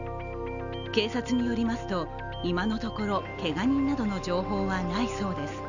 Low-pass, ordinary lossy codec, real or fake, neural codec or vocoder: 7.2 kHz; none; real; none